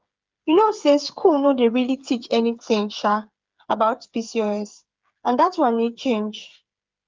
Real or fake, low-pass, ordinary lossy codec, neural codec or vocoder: fake; 7.2 kHz; Opus, 32 kbps; codec, 16 kHz, 8 kbps, FreqCodec, smaller model